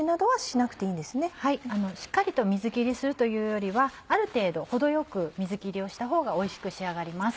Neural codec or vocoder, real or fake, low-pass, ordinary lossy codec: none; real; none; none